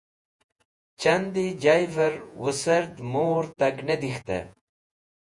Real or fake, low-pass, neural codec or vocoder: fake; 10.8 kHz; vocoder, 48 kHz, 128 mel bands, Vocos